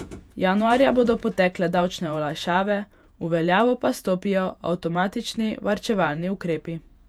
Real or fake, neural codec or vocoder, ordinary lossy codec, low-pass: fake; vocoder, 48 kHz, 128 mel bands, Vocos; none; 19.8 kHz